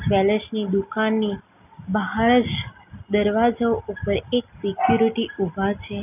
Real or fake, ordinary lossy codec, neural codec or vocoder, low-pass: real; none; none; 3.6 kHz